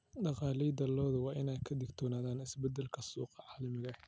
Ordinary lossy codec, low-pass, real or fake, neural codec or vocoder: none; none; real; none